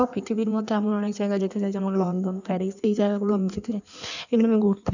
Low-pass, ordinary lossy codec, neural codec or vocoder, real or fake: 7.2 kHz; none; codec, 16 kHz in and 24 kHz out, 1.1 kbps, FireRedTTS-2 codec; fake